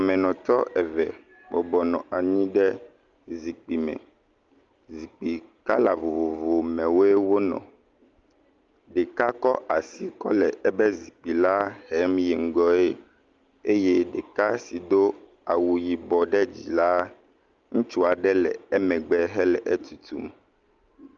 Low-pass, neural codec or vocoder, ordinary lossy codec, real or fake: 7.2 kHz; none; Opus, 32 kbps; real